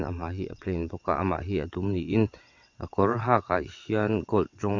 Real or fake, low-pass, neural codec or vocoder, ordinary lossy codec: fake; 7.2 kHz; vocoder, 22.05 kHz, 80 mel bands, WaveNeXt; MP3, 48 kbps